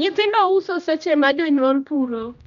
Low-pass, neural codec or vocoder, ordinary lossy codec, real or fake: 7.2 kHz; codec, 16 kHz, 1 kbps, X-Codec, HuBERT features, trained on general audio; none; fake